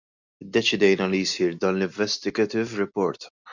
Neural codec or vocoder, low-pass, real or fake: none; 7.2 kHz; real